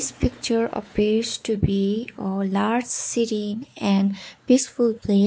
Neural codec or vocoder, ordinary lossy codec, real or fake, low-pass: codec, 16 kHz, 4 kbps, X-Codec, WavLM features, trained on Multilingual LibriSpeech; none; fake; none